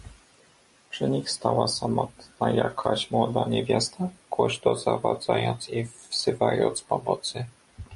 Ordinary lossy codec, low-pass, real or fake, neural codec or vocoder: MP3, 48 kbps; 14.4 kHz; real; none